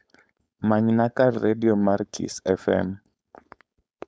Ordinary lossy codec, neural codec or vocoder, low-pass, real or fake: none; codec, 16 kHz, 4.8 kbps, FACodec; none; fake